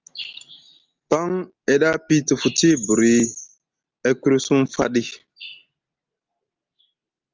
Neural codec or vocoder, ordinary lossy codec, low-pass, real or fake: none; Opus, 32 kbps; 7.2 kHz; real